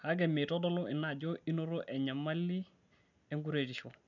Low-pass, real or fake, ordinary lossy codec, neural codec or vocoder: 7.2 kHz; real; none; none